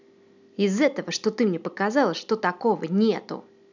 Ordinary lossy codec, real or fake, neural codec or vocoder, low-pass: none; real; none; 7.2 kHz